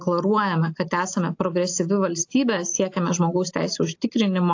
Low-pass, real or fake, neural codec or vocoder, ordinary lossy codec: 7.2 kHz; real; none; AAC, 48 kbps